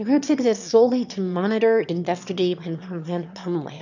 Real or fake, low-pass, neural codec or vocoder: fake; 7.2 kHz; autoencoder, 22.05 kHz, a latent of 192 numbers a frame, VITS, trained on one speaker